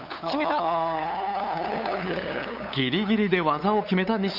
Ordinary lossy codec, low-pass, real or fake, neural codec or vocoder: none; 5.4 kHz; fake; codec, 16 kHz, 8 kbps, FunCodec, trained on LibriTTS, 25 frames a second